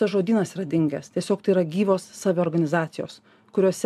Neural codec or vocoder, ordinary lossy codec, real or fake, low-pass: none; MP3, 96 kbps; real; 14.4 kHz